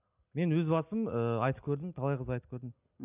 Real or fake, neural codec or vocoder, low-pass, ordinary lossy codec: real; none; 3.6 kHz; none